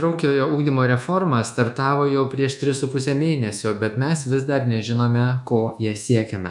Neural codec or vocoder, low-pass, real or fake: codec, 24 kHz, 1.2 kbps, DualCodec; 10.8 kHz; fake